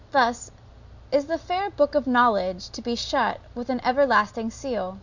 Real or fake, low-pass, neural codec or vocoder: real; 7.2 kHz; none